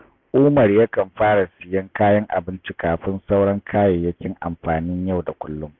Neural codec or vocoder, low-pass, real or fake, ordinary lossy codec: none; 7.2 kHz; real; none